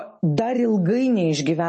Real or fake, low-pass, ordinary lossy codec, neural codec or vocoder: real; 10.8 kHz; MP3, 32 kbps; none